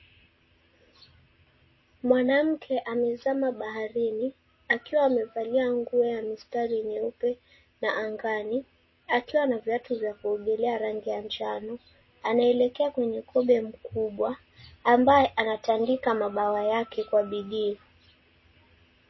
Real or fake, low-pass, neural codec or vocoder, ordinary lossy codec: real; 7.2 kHz; none; MP3, 24 kbps